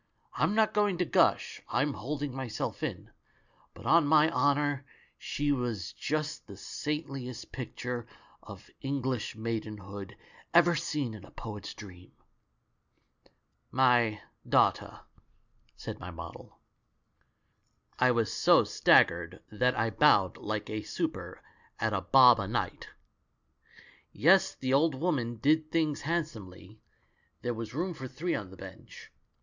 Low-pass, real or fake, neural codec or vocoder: 7.2 kHz; real; none